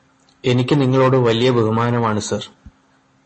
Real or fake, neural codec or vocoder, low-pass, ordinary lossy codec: real; none; 10.8 kHz; MP3, 32 kbps